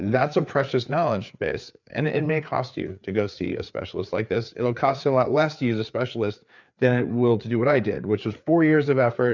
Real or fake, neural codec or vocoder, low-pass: fake; codec, 16 kHz, 4 kbps, FreqCodec, larger model; 7.2 kHz